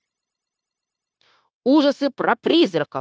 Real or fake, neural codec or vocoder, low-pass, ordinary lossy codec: fake; codec, 16 kHz, 0.9 kbps, LongCat-Audio-Codec; none; none